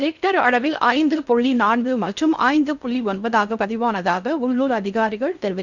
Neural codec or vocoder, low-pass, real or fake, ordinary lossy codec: codec, 16 kHz in and 24 kHz out, 0.6 kbps, FocalCodec, streaming, 4096 codes; 7.2 kHz; fake; none